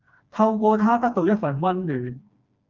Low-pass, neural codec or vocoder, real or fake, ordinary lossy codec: 7.2 kHz; codec, 16 kHz, 2 kbps, FreqCodec, smaller model; fake; Opus, 24 kbps